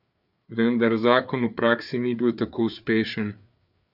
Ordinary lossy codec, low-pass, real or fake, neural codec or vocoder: none; 5.4 kHz; fake; codec, 16 kHz, 4 kbps, FreqCodec, larger model